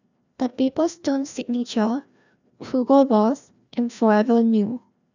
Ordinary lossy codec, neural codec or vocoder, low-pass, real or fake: none; codec, 16 kHz, 1 kbps, FreqCodec, larger model; 7.2 kHz; fake